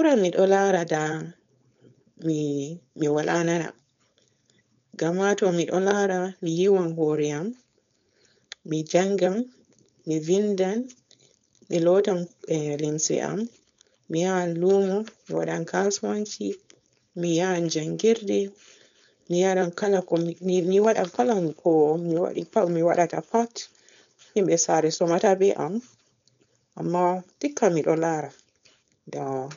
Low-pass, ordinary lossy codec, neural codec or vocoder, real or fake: 7.2 kHz; none; codec, 16 kHz, 4.8 kbps, FACodec; fake